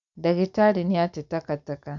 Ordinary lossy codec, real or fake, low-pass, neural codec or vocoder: MP3, 64 kbps; real; 7.2 kHz; none